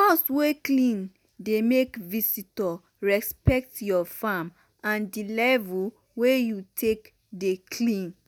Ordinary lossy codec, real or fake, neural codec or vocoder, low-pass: none; real; none; none